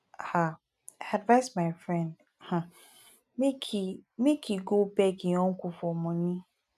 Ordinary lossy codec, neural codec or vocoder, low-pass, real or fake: none; none; 14.4 kHz; real